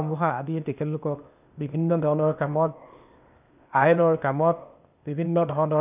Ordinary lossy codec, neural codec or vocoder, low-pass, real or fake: none; codec, 16 kHz, 0.8 kbps, ZipCodec; 3.6 kHz; fake